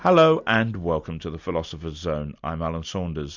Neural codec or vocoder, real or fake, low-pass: none; real; 7.2 kHz